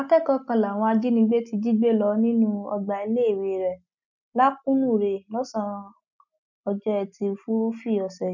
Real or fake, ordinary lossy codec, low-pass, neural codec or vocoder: real; none; 7.2 kHz; none